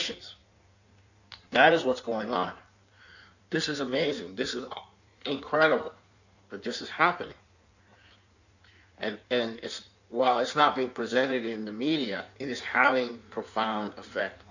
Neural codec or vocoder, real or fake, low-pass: codec, 16 kHz in and 24 kHz out, 1.1 kbps, FireRedTTS-2 codec; fake; 7.2 kHz